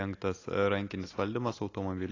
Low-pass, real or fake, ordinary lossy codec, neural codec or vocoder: 7.2 kHz; real; AAC, 32 kbps; none